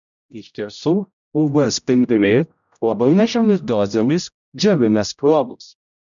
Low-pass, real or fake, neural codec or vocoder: 7.2 kHz; fake; codec, 16 kHz, 0.5 kbps, X-Codec, HuBERT features, trained on general audio